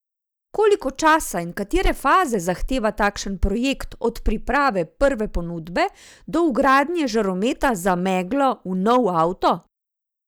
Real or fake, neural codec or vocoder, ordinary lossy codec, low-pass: real; none; none; none